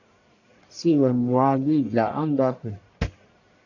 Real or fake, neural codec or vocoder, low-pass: fake; codec, 44.1 kHz, 1.7 kbps, Pupu-Codec; 7.2 kHz